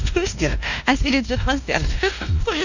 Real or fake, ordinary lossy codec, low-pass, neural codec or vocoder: fake; none; 7.2 kHz; codec, 16 kHz, 1 kbps, X-Codec, WavLM features, trained on Multilingual LibriSpeech